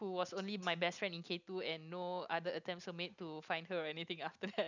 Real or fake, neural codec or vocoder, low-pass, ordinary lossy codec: real; none; 7.2 kHz; none